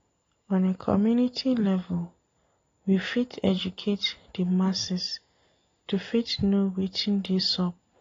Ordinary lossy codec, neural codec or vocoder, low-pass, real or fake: AAC, 32 kbps; none; 7.2 kHz; real